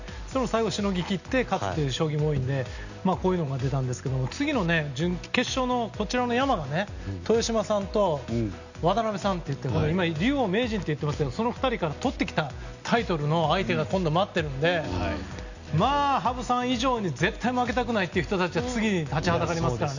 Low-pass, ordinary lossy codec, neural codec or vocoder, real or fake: 7.2 kHz; none; none; real